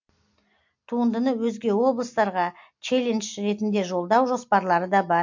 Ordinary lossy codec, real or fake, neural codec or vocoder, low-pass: MP3, 48 kbps; real; none; 7.2 kHz